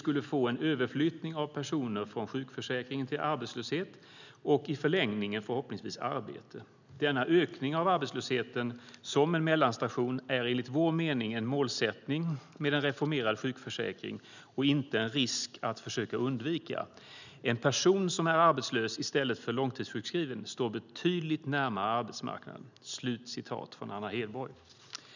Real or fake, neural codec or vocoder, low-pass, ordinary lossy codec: real; none; 7.2 kHz; none